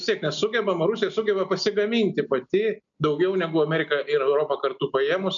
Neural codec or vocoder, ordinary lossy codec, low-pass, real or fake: none; MP3, 96 kbps; 7.2 kHz; real